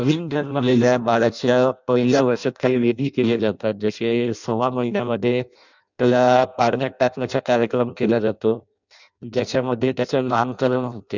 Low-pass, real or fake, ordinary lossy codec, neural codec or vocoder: 7.2 kHz; fake; none; codec, 16 kHz in and 24 kHz out, 0.6 kbps, FireRedTTS-2 codec